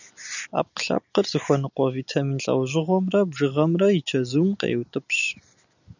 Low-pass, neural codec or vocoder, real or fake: 7.2 kHz; none; real